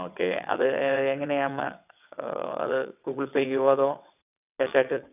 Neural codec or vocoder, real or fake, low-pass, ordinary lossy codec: vocoder, 22.05 kHz, 80 mel bands, WaveNeXt; fake; 3.6 kHz; none